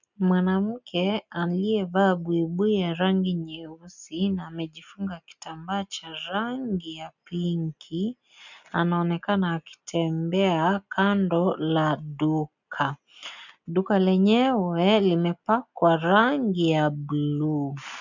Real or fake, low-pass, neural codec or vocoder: real; 7.2 kHz; none